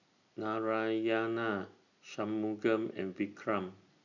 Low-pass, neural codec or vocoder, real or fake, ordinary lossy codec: 7.2 kHz; none; real; none